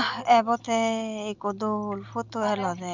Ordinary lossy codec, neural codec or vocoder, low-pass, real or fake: Opus, 64 kbps; none; 7.2 kHz; real